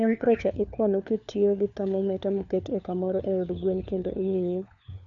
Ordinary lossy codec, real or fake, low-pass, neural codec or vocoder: none; fake; 7.2 kHz; codec, 16 kHz, 4 kbps, FunCodec, trained on LibriTTS, 50 frames a second